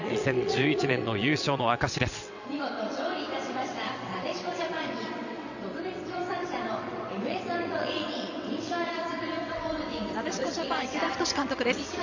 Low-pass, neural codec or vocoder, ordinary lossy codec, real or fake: 7.2 kHz; vocoder, 22.05 kHz, 80 mel bands, WaveNeXt; none; fake